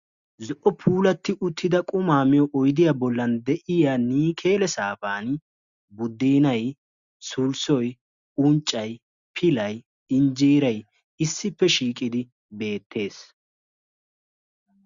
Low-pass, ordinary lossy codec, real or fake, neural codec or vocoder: 7.2 kHz; Opus, 64 kbps; real; none